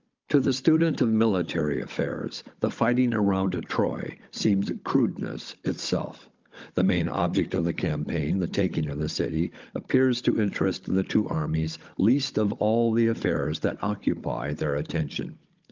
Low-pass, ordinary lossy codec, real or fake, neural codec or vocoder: 7.2 kHz; Opus, 24 kbps; fake; codec, 16 kHz, 16 kbps, FunCodec, trained on Chinese and English, 50 frames a second